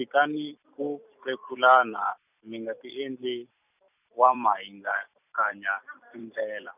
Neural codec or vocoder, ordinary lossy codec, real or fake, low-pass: vocoder, 44.1 kHz, 128 mel bands every 256 samples, BigVGAN v2; none; fake; 3.6 kHz